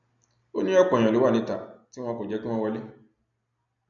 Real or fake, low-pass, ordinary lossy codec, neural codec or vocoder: real; 7.2 kHz; none; none